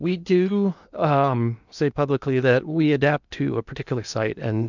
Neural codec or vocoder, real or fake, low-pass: codec, 16 kHz in and 24 kHz out, 0.8 kbps, FocalCodec, streaming, 65536 codes; fake; 7.2 kHz